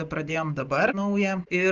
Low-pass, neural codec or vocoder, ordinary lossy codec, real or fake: 7.2 kHz; none; Opus, 24 kbps; real